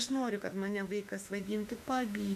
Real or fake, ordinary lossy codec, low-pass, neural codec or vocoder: fake; AAC, 96 kbps; 14.4 kHz; autoencoder, 48 kHz, 32 numbers a frame, DAC-VAE, trained on Japanese speech